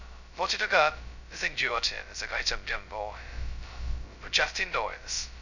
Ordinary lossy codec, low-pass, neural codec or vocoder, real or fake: none; 7.2 kHz; codec, 16 kHz, 0.2 kbps, FocalCodec; fake